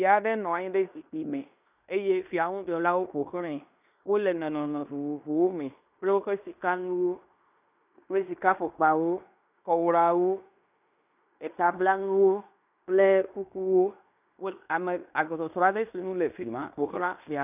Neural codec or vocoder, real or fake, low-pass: codec, 16 kHz in and 24 kHz out, 0.9 kbps, LongCat-Audio-Codec, fine tuned four codebook decoder; fake; 3.6 kHz